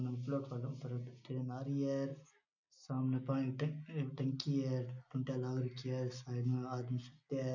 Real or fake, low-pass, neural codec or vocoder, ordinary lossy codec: real; 7.2 kHz; none; none